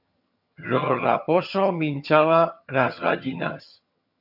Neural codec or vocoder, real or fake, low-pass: vocoder, 22.05 kHz, 80 mel bands, HiFi-GAN; fake; 5.4 kHz